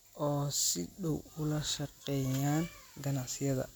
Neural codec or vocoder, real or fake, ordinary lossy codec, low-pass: none; real; none; none